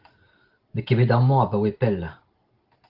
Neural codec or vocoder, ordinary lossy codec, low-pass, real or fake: none; Opus, 16 kbps; 5.4 kHz; real